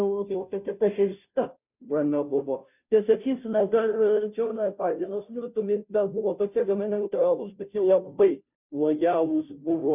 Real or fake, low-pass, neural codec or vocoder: fake; 3.6 kHz; codec, 16 kHz, 0.5 kbps, FunCodec, trained on Chinese and English, 25 frames a second